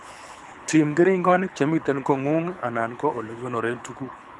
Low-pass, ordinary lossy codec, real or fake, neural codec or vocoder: none; none; fake; codec, 24 kHz, 6 kbps, HILCodec